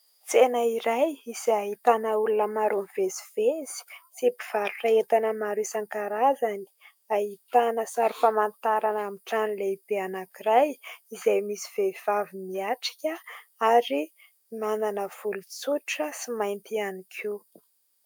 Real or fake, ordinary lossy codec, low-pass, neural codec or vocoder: fake; MP3, 96 kbps; 19.8 kHz; autoencoder, 48 kHz, 128 numbers a frame, DAC-VAE, trained on Japanese speech